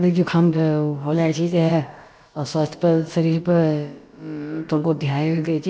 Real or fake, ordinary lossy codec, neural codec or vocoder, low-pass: fake; none; codec, 16 kHz, about 1 kbps, DyCAST, with the encoder's durations; none